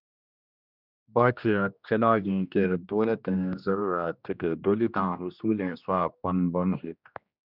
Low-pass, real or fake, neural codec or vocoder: 5.4 kHz; fake; codec, 16 kHz, 1 kbps, X-Codec, HuBERT features, trained on general audio